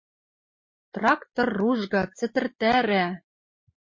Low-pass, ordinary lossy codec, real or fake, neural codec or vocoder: 7.2 kHz; MP3, 32 kbps; real; none